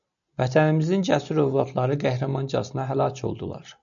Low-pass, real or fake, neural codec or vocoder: 7.2 kHz; real; none